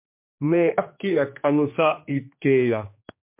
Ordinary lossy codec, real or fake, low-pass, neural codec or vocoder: MP3, 32 kbps; fake; 3.6 kHz; codec, 16 kHz, 2 kbps, X-Codec, HuBERT features, trained on general audio